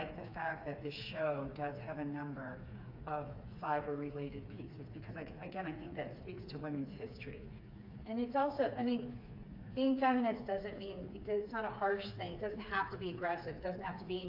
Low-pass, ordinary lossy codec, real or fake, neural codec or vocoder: 5.4 kHz; AAC, 32 kbps; fake; codec, 16 kHz, 4 kbps, FreqCodec, smaller model